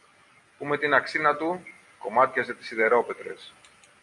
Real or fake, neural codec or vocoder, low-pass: real; none; 10.8 kHz